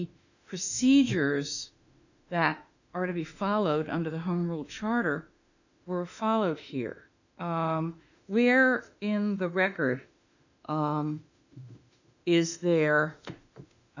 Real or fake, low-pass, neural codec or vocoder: fake; 7.2 kHz; autoencoder, 48 kHz, 32 numbers a frame, DAC-VAE, trained on Japanese speech